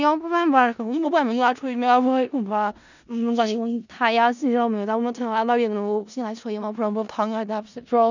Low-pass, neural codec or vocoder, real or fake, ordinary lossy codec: 7.2 kHz; codec, 16 kHz in and 24 kHz out, 0.4 kbps, LongCat-Audio-Codec, four codebook decoder; fake; none